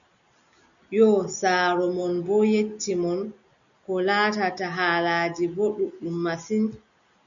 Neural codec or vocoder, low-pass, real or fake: none; 7.2 kHz; real